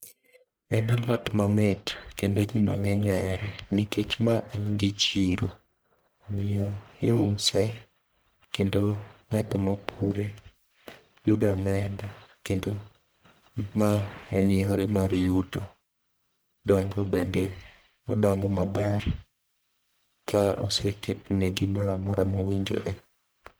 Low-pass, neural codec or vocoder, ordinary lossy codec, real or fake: none; codec, 44.1 kHz, 1.7 kbps, Pupu-Codec; none; fake